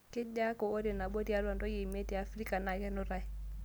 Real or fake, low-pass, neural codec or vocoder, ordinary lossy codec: real; none; none; none